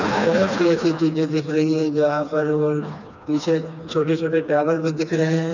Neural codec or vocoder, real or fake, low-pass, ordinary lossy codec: codec, 16 kHz, 2 kbps, FreqCodec, smaller model; fake; 7.2 kHz; none